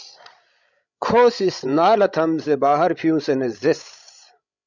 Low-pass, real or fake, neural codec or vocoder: 7.2 kHz; fake; codec, 16 kHz, 16 kbps, FreqCodec, larger model